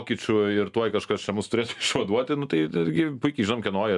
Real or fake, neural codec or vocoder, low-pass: real; none; 10.8 kHz